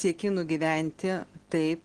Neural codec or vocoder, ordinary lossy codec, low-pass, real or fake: none; Opus, 16 kbps; 9.9 kHz; real